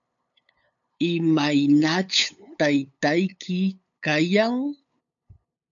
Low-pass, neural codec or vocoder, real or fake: 7.2 kHz; codec, 16 kHz, 8 kbps, FunCodec, trained on LibriTTS, 25 frames a second; fake